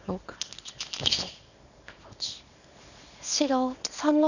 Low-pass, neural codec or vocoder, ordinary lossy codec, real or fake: 7.2 kHz; codec, 24 kHz, 0.9 kbps, WavTokenizer, small release; none; fake